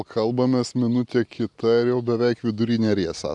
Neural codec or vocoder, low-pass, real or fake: none; 10.8 kHz; real